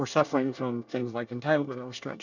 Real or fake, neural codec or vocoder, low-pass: fake; codec, 24 kHz, 1 kbps, SNAC; 7.2 kHz